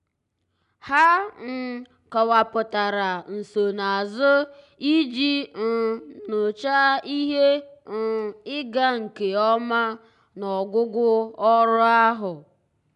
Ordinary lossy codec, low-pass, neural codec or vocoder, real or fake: none; 10.8 kHz; none; real